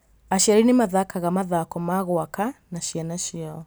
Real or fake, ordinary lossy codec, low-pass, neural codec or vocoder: real; none; none; none